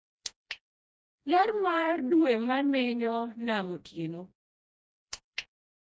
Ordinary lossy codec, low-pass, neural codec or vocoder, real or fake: none; none; codec, 16 kHz, 1 kbps, FreqCodec, smaller model; fake